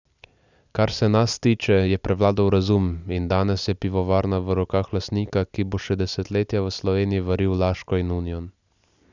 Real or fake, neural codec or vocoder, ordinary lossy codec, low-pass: real; none; none; 7.2 kHz